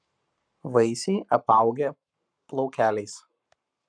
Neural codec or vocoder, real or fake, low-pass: codec, 44.1 kHz, 7.8 kbps, Pupu-Codec; fake; 9.9 kHz